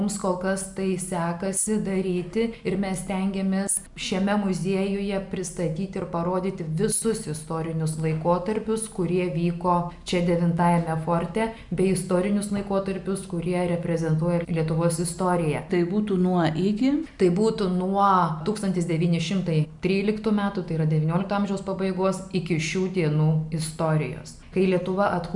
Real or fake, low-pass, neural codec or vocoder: real; 10.8 kHz; none